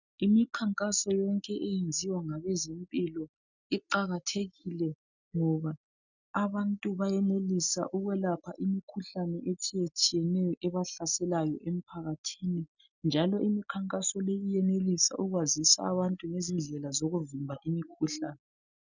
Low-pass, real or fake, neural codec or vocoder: 7.2 kHz; real; none